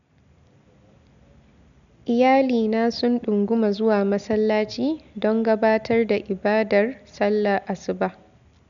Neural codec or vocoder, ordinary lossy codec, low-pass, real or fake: none; none; 7.2 kHz; real